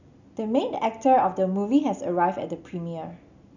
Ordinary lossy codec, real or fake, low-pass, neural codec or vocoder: none; real; 7.2 kHz; none